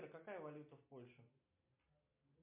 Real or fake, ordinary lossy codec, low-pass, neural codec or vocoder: real; MP3, 32 kbps; 3.6 kHz; none